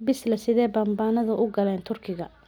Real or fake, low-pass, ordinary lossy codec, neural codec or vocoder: real; none; none; none